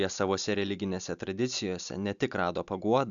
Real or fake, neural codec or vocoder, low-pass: real; none; 7.2 kHz